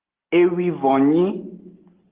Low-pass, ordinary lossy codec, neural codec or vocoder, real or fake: 3.6 kHz; Opus, 16 kbps; none; real